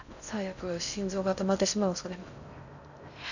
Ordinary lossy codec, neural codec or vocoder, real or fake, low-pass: none; codec, 16 kHz in and 24 kHz out, 0.6 kbps, FocalCodec, streaming, 2048 codes; fake; 7.2 kHz